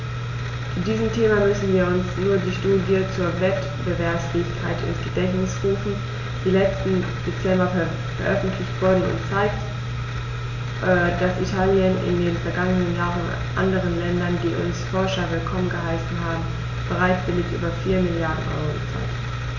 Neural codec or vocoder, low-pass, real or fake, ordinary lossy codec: none; 7.2 kHz; real; none